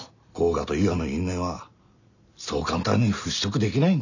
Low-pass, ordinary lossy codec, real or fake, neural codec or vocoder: 7.2 kHz; none; real; none